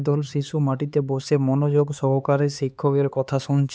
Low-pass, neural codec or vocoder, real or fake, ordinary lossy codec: none; codec, 16 kHz, 4 kbps, X-Codec, HuBERT features, trained on LibriSpeech; fake; none